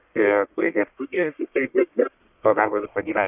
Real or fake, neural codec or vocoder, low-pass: fake; codec, 44.1 kHz, 1.7 kbps, Pupu-Codec; 3.6 kHz